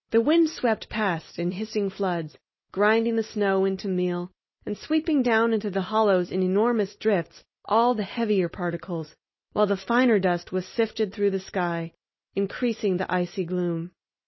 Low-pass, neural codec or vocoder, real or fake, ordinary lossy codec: 7.2 kHz; none; real; MP3, 24 kbps